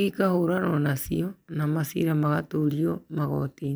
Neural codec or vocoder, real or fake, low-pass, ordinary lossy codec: none; real; none; none